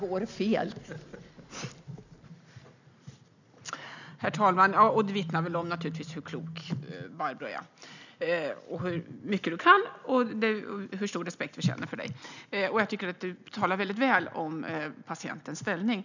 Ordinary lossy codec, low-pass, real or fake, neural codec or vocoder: none; 7.2 kHz; real; none